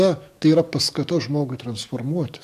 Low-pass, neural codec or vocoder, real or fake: 14.4 kHz; codec, 44.1 kHz, 7.8 kbps, DAC; fake